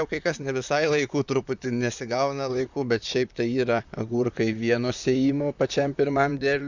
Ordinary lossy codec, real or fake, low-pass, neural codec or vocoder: Opus, 64 kbps; fake; 7.2 kHz; vocoder, 44.1 kHz, 128 mel bands, Pupu-Vocoder